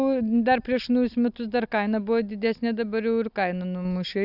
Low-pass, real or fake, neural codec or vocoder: 5.4 kHz; real; none